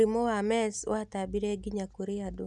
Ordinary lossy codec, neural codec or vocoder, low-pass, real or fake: none; none; none; real